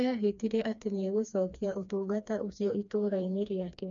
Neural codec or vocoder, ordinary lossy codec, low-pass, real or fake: codec, 16 kHz, 2 kbps, FreqCodec, smaller model; none; 7.2 kHz; fake